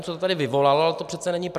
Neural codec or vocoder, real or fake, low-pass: none; real; 14.4 kHz